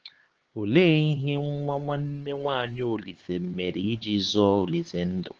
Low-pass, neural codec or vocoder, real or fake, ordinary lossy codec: 7.2 kHz; codec, 16 kHz, 4 kbps, X-Codec, HuBERT features, trained on LibriSpeech; fake; Opus, 16 kbps